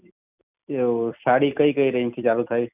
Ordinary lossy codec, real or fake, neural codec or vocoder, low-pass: none; fake; vocoder, 44.1 kHz, 128 mel bands every 256 samples, BigVGAN v2; 3.6 kHz